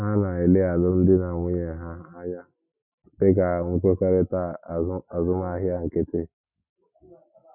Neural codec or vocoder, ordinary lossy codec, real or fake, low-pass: none; none; real; 3.6 kHz